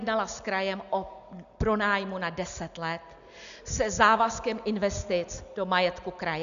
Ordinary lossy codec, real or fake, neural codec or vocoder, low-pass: MP3, 96 kbps; real; none; 7.2 kHz